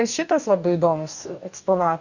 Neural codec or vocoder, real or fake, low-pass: codec, 44.1 kHz, 2.6 kbps, DAC; fake; 7.2 kHz